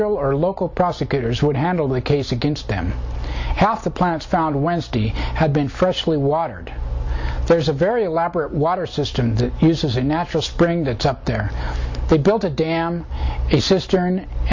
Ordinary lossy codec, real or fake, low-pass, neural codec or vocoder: MP3, 64 kbps; real; 7.2 kHz; none